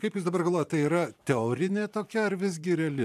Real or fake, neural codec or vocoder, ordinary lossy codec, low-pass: fake; vocoder, 44.1 kHz, 128 mel bands every 512 samples, BigVGAN v2; AAC, 96 kbps; 14.4 kHz